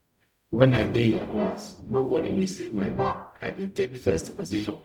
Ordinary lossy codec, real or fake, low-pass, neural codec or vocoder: none; fake; 19.8 kHz; codec, 44.1 kHz, 0.9 kbps, DAC